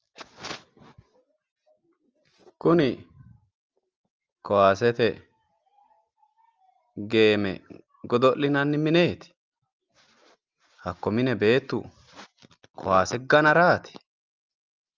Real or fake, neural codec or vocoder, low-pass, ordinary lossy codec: real; none; 7.2 kHz; Opus, 24 kbps